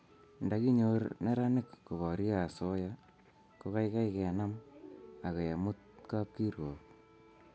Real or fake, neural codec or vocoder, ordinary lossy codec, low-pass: real; none; none; none